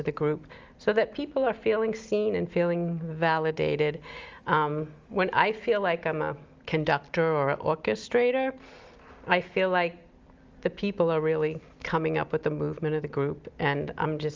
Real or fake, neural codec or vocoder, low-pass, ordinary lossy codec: real; none; 7.2 kHz; Opus, 32 kbps